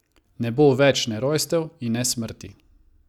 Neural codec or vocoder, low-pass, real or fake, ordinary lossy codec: none; 19.8 kHz; real; none